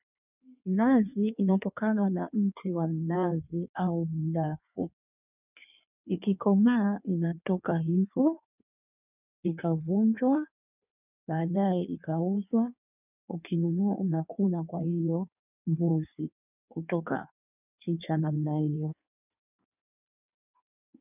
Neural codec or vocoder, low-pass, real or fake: codec, 16 kHz in and 24 kHz out, 1.1 kbps, FireRedTTS-2 codec; 3.6 kHz; fake